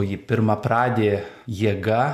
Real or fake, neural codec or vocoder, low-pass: real; none; 14.4 kHz